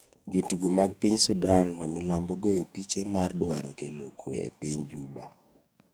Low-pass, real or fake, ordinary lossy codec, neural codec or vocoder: none; fake; none; codec, 44.1 kHz, 2.6 kbps, DAC